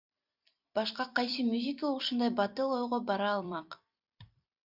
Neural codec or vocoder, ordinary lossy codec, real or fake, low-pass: vocoder, 44.1 kHz, 128 mel bands every 256 samples, BigVGAN v2; Opus, 64 kbps; fake; 5.4 kHz